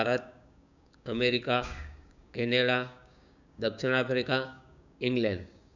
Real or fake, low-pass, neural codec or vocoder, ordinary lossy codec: fake; 7.2 kHz; codec, 16 kHz, 6 kbps, DAC; none